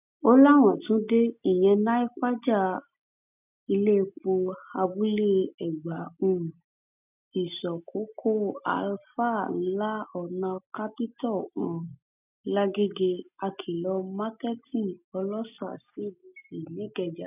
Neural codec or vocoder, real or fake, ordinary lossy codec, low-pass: none; real; none; 3.6 kHz